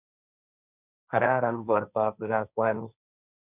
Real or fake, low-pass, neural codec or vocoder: fake; 3.6 kHz; codec, 16 kHz, 1.1 kbps, Voila-Tokenizer